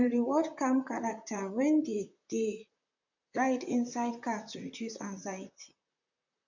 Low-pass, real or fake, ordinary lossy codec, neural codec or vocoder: 7.2 kHz; fake; none; vocoder, 44.1 kHz, 128 mel bands, Pupu-Vocoder